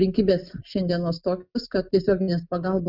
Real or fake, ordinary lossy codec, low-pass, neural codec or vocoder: real; Opus, 64 kbps; 5.4 kHz; none